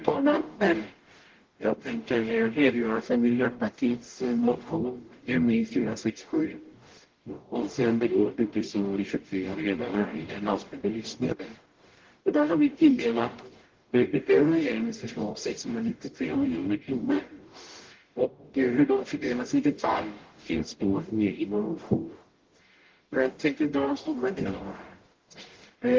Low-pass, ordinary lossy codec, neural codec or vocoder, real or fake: 7.2 kHz; Opus, 16 kbps; codec, 44.1 kHz, 0.9 kbps, DAC; fake